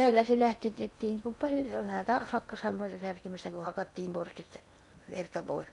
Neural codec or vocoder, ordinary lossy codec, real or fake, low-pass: codec, 16 kHz in and 24 kHz out, 0.8 kbps, FocalCodec, streaming, 65536 codes; none; fake; 10.8 kHz